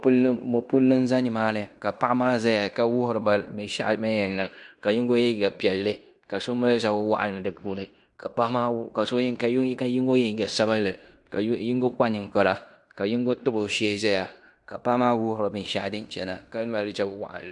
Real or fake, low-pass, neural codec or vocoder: fake; 10.8 kHz; codec, 16 kHz in and 24 kHz out, 0.9 kbps, LongCat-Audio-Codec, fine tuned four codebook decoder